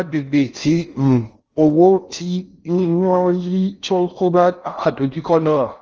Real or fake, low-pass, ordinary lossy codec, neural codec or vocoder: fake; 7.2 kHz; Opus, 24 kbps; codec, 16 kHz in and 24 kHz out, 0.6 kbps, FocalCodec, streaming, 4096 codes